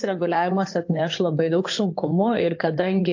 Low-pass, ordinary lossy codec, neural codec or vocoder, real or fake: 7.2 kHz; AAC, 48 kbps; codec, 16 kHz in and 24 kHz out, 2.2 kbps, FireRedTTS-2 codec; fake